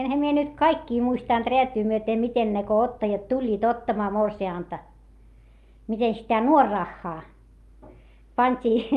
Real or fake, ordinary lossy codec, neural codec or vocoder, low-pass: real; Opus, 32 kbps; none; 14.4 kHz